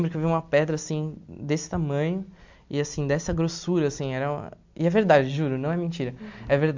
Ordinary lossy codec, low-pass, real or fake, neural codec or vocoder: MP3, 64 kbps; 7.2 kHz; real; none